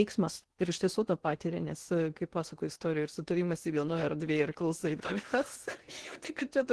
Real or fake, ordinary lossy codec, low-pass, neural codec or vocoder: fake; Opus, 16 kbps; 10.8 kHz; codec, 16 kHz in and 24 kHz out, 0.8 kbps, FocalCodec, streaming, 65536 codes